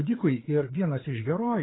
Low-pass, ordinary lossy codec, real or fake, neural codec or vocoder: 7.2 kHz; AAC, 16 kbps; fake; codec, 16 kHz, 4 kbps, FreqCodec, larger model